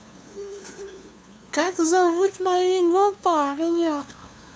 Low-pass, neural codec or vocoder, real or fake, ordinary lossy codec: none; codec, 16 kHz, 2 kbps, FunCodec, trained on LibriTTS, 25 frames a second; fake; none